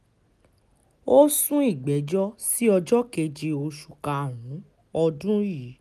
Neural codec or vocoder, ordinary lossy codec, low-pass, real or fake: none; none; 14.4 kHz; real